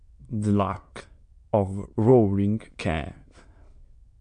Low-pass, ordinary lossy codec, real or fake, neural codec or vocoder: 9.9 kHz; MP3, 64 kbps; fake; autoencoder, 22.05 kHz, a latent of 192 numbers a frame, VITS, trained on many speakers